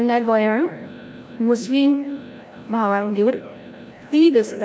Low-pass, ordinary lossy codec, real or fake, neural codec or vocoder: none; none; fake; codec, 16 kHz, 0.5 kbps, FreqCodec, larger model